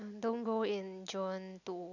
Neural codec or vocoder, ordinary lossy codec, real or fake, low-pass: none; none; real; 7.2 kHz